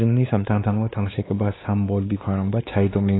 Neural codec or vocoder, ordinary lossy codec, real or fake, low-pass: codec, 16 kHz, 2 kbps, X-Codec, WavLM features, trained on Multilingual LibriSpeech; AAC, 16 kbps; fake; 7.2 kHz